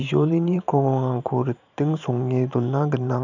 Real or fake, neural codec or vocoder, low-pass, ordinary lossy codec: fake; vocoder, 44.1 kHz, 128 mel bands every 512 samples, BigVGAN v2; 7.2 kHz; none